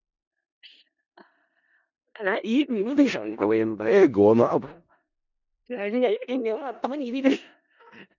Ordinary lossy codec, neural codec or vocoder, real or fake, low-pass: none; codec, 16 kHz in and 24 kHz out, 0.4 kbps, LongCat-Audio-Codec, four codebook decoder; fake; 7.2 kHz